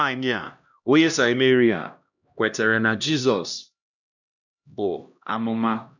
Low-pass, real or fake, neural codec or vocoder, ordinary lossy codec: 7.2 kHz; fake; codec, 16 kHz, 1 kbps, X-Codec, HuBERT features, trained on LibriSpeech; none